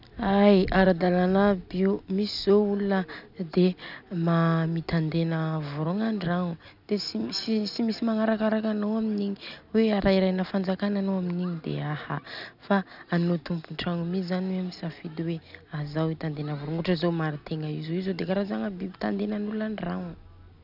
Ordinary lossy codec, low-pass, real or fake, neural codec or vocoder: none; 5.4 kHz; real; none